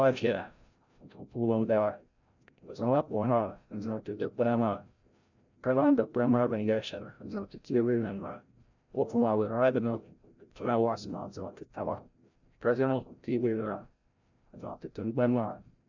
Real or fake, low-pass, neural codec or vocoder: fake; 7.2 kHz; codec, 16 kHz, 0.5 kbps, FreqCodec, larger model